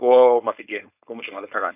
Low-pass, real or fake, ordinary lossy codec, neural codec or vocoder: 3.6 kHz; fake; none; codec, 16 kHz, 4.8 kbps, FACodec